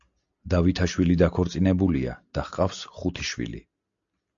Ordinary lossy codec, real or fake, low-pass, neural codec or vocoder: AAC, 64 kbps; real; 7.2 kHz; none